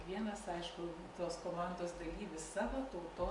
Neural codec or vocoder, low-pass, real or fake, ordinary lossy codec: none; 10.8 kHz; real; MP3, 48 kbps